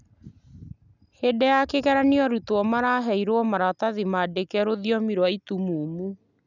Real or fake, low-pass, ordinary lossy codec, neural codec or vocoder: real; 7.2 kHz; none; none